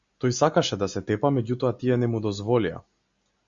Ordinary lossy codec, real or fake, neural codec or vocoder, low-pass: Opus, 64 kbps; real; none; 7.2 kHz